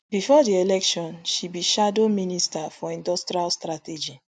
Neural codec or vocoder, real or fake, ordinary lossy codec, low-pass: none; real; none; 9.9 kHz